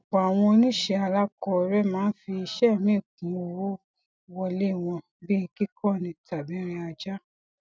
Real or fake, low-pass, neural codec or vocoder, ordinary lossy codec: real; none; none; none